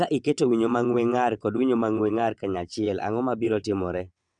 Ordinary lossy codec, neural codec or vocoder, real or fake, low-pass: none; vocoder, 22.05 kHz, 80 mel bands, WaveNeXt; fake; 9.9 kHz